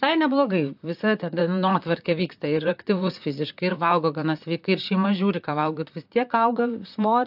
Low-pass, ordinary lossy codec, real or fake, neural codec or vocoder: 5.4 kHz; AAC, 48 kbps; fake; vocoder, 44.1 kHz, 128 mel bands, Pupu-Vocoder